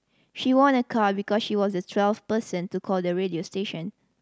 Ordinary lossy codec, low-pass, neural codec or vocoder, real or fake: none; none; none; real